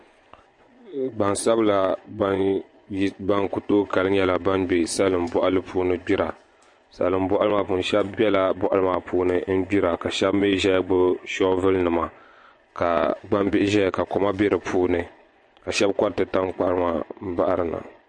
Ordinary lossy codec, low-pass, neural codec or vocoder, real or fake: MP3, 48 kbps; 10.8 kHz; vocoder, 24 kHz, 100 mel bands, Vocos; fake